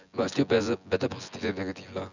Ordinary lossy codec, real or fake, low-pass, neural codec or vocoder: none; fake; 7.2 kHz; vocoder, 24 kHz, 100 mel bands, Vocos